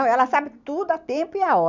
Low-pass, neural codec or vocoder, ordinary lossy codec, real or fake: 7.2 kHz; none; none; real